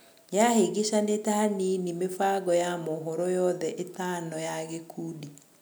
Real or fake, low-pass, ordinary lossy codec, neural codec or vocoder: real; none; none; none